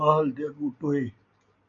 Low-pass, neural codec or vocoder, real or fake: 7.2 kHz; none; real